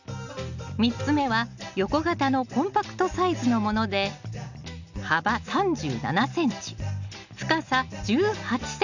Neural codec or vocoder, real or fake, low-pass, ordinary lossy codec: none; real; 7.2 kHz; none